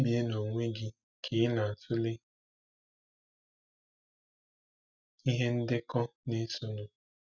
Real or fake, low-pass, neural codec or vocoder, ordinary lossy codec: real; 7.2 kHz; none; none